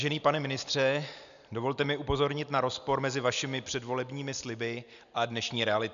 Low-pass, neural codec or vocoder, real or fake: 7.2 kHz; none; real